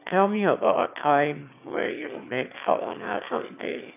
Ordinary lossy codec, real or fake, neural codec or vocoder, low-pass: none; fake; autoencoder, 22.05 kHz, a latent of 192 numbers a frame, VITS, trained on one speaker; 3.6 kHz